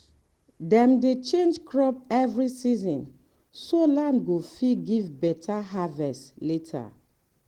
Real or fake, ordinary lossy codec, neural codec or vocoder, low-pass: real; Opus, 16 kbps; none; 19.8 kHz